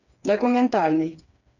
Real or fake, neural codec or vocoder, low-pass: fake; codec, 16 kHz, 4 kbps, FreqCodec, smaller model; 7.2 kHz